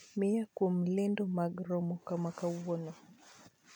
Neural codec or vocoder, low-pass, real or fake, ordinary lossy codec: none; 19.8 kHz; real; none